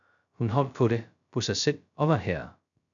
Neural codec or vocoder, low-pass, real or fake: codec, 16 kHz, 0.2 kbps, FocalCodec; 7.2 kHz; fake